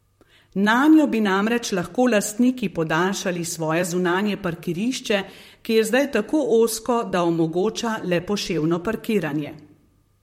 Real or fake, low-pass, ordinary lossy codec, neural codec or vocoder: fake; 19.8 kHz; MP3, 64 kbps; vocoder, 44.1 kHz, 128 mel bands, Pupu-Vocoder